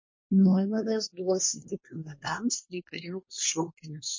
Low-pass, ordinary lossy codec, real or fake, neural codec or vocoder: 7.2 kHz; MP3, 32 kbps; fake; codec, 24 kHz, 1 kbps, SNAC